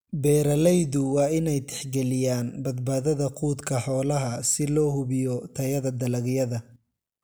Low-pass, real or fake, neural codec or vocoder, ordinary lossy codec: none; real; none; none